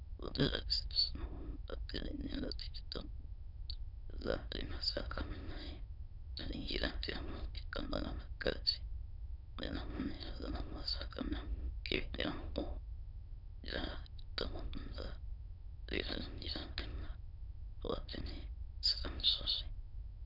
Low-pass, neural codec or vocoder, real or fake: 5.4 kHz; autoencoder, 22.05 kHz, a latent of 192 numbers a frame, VITS, trained on many speakers; fake